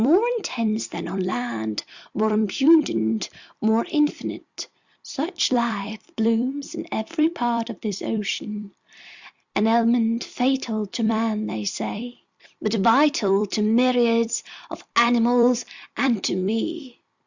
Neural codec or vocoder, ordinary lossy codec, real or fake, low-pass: vocoder, 44.1 kHz, 128 mel bands every 256 samples, BigVGAN v2; Opus, 64 kbps; fake; 7.2 kHz